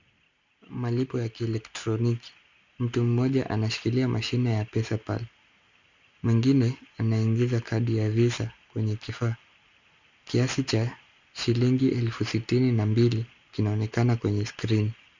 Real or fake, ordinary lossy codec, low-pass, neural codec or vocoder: real; Opus, 64 kbps; 7.2 kHz; none